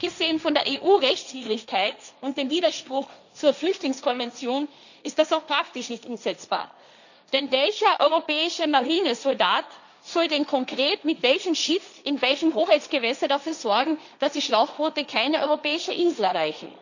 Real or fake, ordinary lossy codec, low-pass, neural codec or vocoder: fake; none; 7.2 kHz; codec, 16 kHz, 1.1 kbps, Voila-Tokenizer